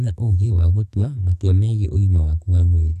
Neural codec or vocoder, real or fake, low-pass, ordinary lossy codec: codec, 44.1 kHz, 2.6 kbps, SNAC; fake; 14.4 kHz; none